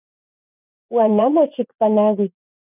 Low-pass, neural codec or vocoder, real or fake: 3.6 kHz; vocoder, 24 kHz, 100 mel bands, Vocos; fake